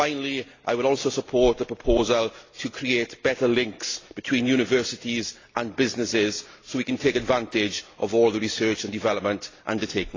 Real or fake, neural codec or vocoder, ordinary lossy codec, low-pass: real; none; AAC, 32 kbps; 7.2 kHz